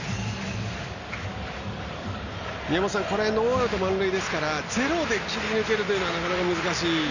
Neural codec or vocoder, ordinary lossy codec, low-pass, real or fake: none; none; 7.2 kHz; real